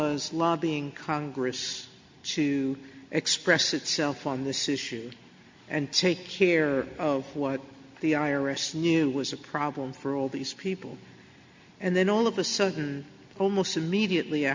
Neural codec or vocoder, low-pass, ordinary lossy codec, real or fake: none; 7.2 kHz; MP3, 48 kbps; real